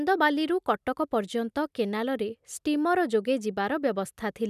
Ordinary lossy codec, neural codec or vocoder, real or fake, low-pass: none; none; real; 14.4 kHz